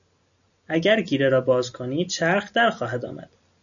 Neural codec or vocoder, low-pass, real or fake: none; 7.2 kHz; real